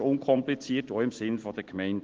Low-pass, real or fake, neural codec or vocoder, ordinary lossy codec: 7.2 kHz; real; none; Opus, 16 kbps